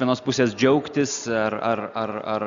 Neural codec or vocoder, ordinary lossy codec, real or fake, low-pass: none; Opus, 64 kbps; real; 7.2 kHz